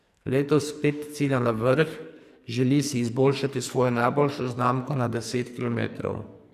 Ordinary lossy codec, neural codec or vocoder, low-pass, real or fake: AAC, 96 kbps; codec, 44.1 kHz, 2.6 kbps, SNAC; 14.4 kHz; fake